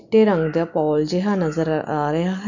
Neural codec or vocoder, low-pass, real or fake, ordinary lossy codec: none; 7.2 kHz; real; none